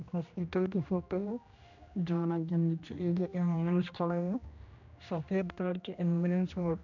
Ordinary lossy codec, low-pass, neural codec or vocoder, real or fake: none; 7.2 kHz; codec, 16 kHz, 1 kbps, X-Codec, HuBERT features, trained on general audio; fake